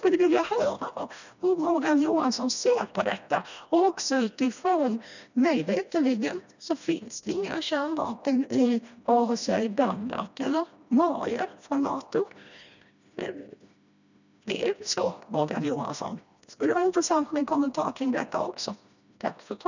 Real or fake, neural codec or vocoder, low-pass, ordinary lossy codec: fake; codec, 16 kHz, 1 kbps, FreqCodec, smaller model; 7.2 kHz; none